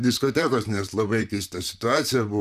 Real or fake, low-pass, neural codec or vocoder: fake; 14.4 kHz; vocoder, 44.1 kHz, 128 mel bands, Pupu-Vocoder